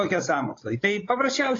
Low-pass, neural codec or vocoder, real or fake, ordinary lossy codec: 7.2 kHz; codec, 16 kHz, 16 kbps, FreqCodec, larger model; fake; AAC, 32 kbps